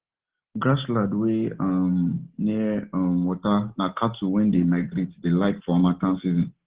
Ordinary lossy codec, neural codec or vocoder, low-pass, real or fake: Opus, 16 kbps; none; 3.6 kHz; real